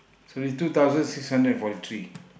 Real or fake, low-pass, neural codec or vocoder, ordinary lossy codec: real; none; none; none